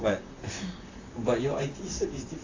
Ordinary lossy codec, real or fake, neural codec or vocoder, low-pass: AAC, 32 kbps; fake; vocoder, 44.1 kHz, 128 mel bands, Pupu-Vocoder; 7.2 kHz